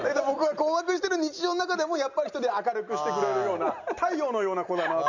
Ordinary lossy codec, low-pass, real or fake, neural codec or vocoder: none; 7.2 kHz; real; none